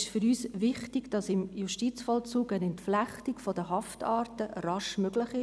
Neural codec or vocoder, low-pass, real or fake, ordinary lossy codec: none; none; real; none